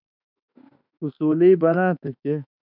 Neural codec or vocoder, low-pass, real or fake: autoencoder, 48 kHz, 32 numbers a frame, DAC-VAE, trained on Japanese speech; 5.4 kHz; fake